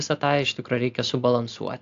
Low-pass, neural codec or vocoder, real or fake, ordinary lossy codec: 7.2 kHz; none; real; AAC, 64 kbps